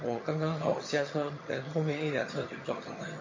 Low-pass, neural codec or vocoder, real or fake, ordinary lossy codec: 7.2 kHz; vocoder, 22.05 kHz, 80 mel bands, HiFi-GAN; fake; MP3, 32 kbps